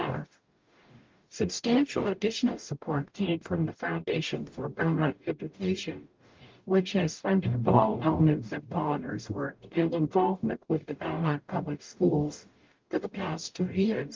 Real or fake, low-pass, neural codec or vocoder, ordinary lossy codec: fake; 7.2 kHz; codec, 44.1 kHz, 0.9 kbps, DAC; Opus, 32 kbps